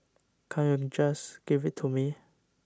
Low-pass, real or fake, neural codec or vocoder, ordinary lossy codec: none; real; none; none